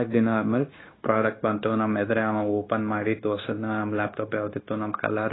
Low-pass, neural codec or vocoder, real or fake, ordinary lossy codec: 7.2 kHz; codec, 16 kHz, 0.9 kbps, LongCat-Audio-Codec; fake; AAC, 16 kbps